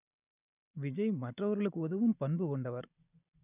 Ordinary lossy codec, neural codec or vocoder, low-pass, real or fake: none; none; 3.6 kHz; real